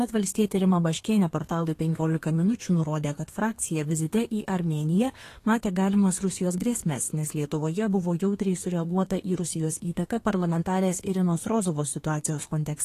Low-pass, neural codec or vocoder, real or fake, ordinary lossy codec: 14.4 kHz; codec, 32 kHz, 1.9 kbps, SNAC; fake; AAC, 48 kbps